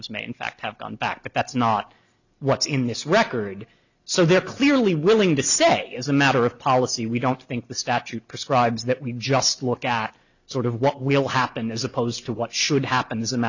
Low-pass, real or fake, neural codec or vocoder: 7.2 kHz; real; none